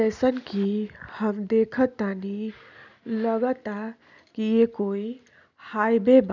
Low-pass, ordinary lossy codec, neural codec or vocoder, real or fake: 7.2 kHz; none; none; real